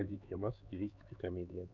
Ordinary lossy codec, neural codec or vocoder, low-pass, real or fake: Opus, 64 kbps; codec, 16 kHz, 4 kbps, X-Codec, HuBERT features, trained on LibriSpeech; 7.2 kHz; fake